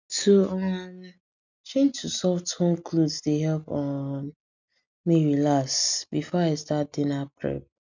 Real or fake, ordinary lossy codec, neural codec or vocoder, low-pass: real; none; none; 7.2 kHz